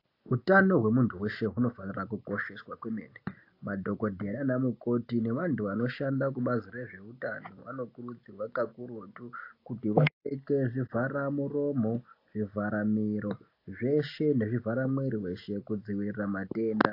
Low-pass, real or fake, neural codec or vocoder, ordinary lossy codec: 5.4 kHz; real; none; AAC, 32 kbps